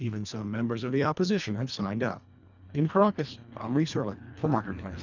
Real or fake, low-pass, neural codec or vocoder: fake; 7.2 kHz; codec, 24 kHz, 1.5 kbps, HILCodec